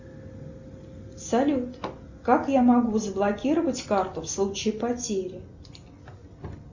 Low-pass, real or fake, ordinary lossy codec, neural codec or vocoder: 7.2 kHz; real; Opus, 64 kbps; none